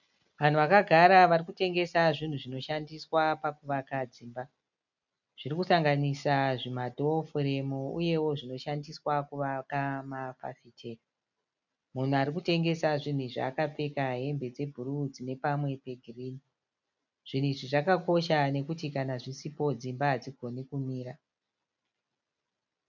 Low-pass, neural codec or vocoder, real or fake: 7.2 kHz; none; real